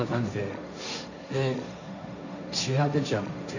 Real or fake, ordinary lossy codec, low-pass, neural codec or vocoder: fake; none; 7.2 kHz; codec, 16 kHz, 1.1 kbps, Voila-Tokenizer